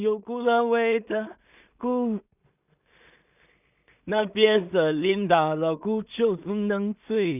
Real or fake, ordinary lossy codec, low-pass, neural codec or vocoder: fake; none; 3.6 kHz; codec, 16 kHz in and 24 kHz out, 0.4 kbps, LongCat-Audio-Codec, two codebook decoder